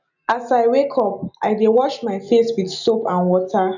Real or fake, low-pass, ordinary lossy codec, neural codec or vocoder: real; 7.2 kHz; none; none